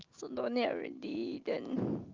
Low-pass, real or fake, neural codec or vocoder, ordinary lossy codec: 7.2 kHz; real; none; Opus, 32 kbps